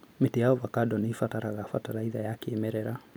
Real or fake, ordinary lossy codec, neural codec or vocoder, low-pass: real; none; none; none